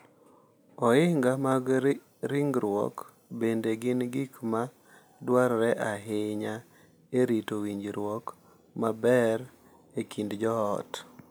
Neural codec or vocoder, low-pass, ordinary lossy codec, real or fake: none; none; none; real